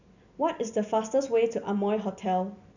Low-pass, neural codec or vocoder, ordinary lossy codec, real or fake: 7.2 kHz; none; none; real